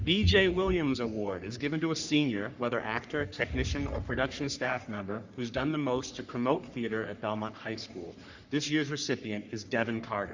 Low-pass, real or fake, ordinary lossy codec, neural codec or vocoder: 7.2 kHz; fake; Opus, 64 kbps; codec, 44.1 kHz, 3.4 kbps, Pupu-Codec